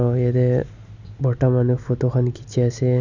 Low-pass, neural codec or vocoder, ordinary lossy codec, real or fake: 7.2 kHz; none; none; real